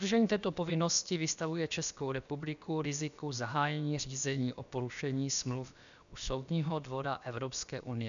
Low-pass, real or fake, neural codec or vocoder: 7.2 kHz; fake; codec, 16 kHz, about 1 kbps, DyCAST, with the encoder's durations